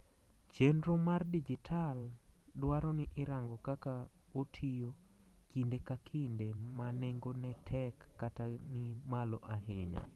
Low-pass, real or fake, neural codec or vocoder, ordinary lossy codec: 19.8 kHz; fake; vocoder, 44.1 kHz, 128 mel bands every 512 samples, BigVGAN v2; Opus, 24 kbps